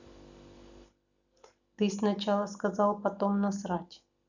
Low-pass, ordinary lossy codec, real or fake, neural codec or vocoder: 7.2 kHz; none; real; none